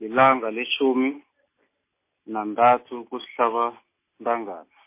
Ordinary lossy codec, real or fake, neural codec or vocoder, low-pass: MP3, 24 kbps; real; none; 3.6 kHz